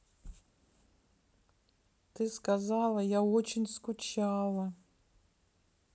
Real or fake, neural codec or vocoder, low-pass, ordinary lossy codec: real; none; none; none